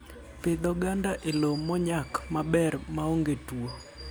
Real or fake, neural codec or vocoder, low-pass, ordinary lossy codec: real; none; none; none